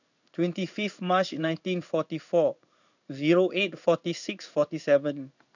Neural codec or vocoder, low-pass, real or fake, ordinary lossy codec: codec, 16 kHz in and 24 kHz out, 1 kbps, XY-Tokenizer; 7.2 kHz; fake; none